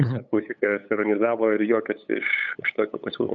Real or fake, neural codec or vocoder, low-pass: fake; codec, 16 kHz, 8 kbps, FunCodec, trained on LibriTTS, 25 frames a second; 7.2 kHz